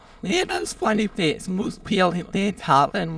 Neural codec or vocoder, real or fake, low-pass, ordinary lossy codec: autoencoder, 22.05 kHz, a latent of 192 numbers a frame, VITS, trained on many speakers; fake; none; none